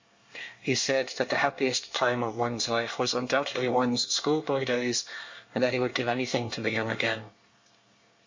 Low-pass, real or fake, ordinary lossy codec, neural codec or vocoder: 7.2 kHz; fake; MP3, 48 kbps; codec, 24 kHz, 1 kbps, SNAC